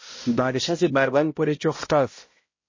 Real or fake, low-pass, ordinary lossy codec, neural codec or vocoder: fake; 7.2 kHz; MP3, 32 kbps; codec, 16 kHz, 0.5 kbps, X-Codec, HuBERT features, trained on balanced general audio